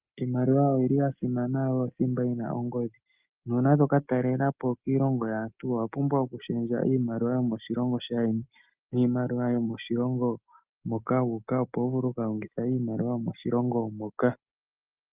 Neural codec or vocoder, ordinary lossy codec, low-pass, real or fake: none; Opus, 32 kbps; 3.6 kHz; real